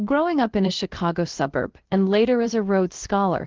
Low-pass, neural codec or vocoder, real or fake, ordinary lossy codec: 7.2 kHz; codec, 16 kHz, about 1 kbps, DyCAST, with the encoder's durations; fake; Opus, 16 kbps